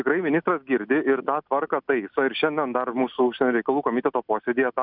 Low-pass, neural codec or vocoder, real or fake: 5.4 kHz; none; real